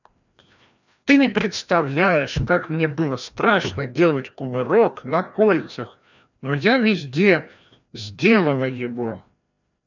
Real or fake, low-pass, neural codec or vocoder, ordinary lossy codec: fake; 7.2 kHz; codec, 16 kHz, 1 kbps, FreqCodec, larger model; none